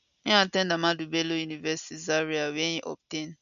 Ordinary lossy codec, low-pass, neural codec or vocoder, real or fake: none; 7.2 kHz; none; real